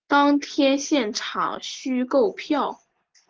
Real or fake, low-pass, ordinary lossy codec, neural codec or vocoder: real; 7.2 kHz; Opus, 16 kbps; none